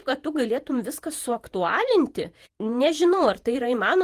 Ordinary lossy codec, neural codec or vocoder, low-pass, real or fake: Opus, 24 kbps; vocoder, 48 kHz, 128 mel bands, Vocos; 14.4 kHz; fake